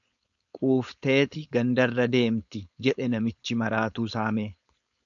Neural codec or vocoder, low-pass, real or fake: codec, 16 kHz, 4.8 kbps, FACodec; 7.2 kHz; fake